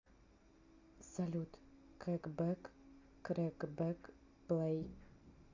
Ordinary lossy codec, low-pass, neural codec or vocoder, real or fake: AAC, 48 kbps; 7.2 kHz; none; real